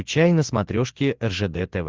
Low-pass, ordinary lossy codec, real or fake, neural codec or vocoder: 7.2 kHz; Opus, 16 kbps; real; none